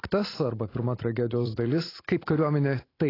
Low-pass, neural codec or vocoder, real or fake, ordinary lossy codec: 5.4 kHz; vocoder, 44.1 kHz, 128 mel bands every 256 samples, BigVGAN v2; fake; AAC, 24 kbps